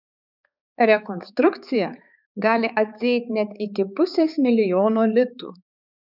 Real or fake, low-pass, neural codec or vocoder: fake; 5.4 kHz; codec, 16 kHz, 4 kbps, X-Codec, HuBERT features, trained on balanced general audio